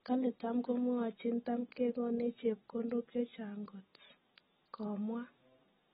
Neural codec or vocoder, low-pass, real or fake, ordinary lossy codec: none; 19.8 kHz; real; AAC, 16 kbps